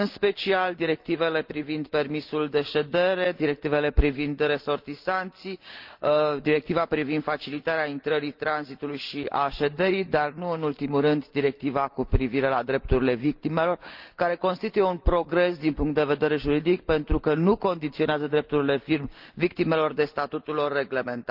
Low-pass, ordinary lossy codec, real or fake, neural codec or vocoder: 5.4 kHz; Opus, 32 kbps; real; none